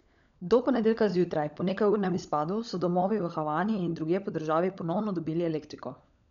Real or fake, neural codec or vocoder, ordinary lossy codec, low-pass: fake; codec, 16 kHz, 16 kbps, FunCodec, trained on LibriTTS, 50 frames a second; MP3, 96 kbps; 7.2 kHz